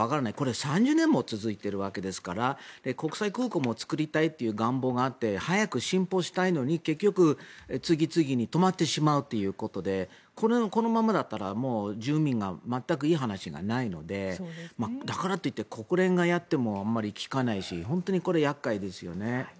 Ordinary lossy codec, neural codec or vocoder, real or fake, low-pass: none; none; real; none